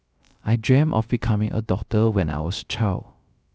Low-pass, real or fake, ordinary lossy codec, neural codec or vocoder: none; fake; none; codec, 16 kHz, 0.3 kbps, FocalCodec